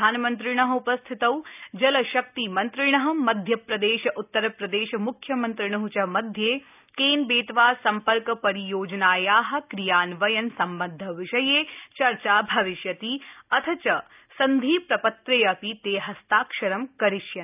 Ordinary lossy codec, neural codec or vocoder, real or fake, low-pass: none; none; real; 3.6 kHz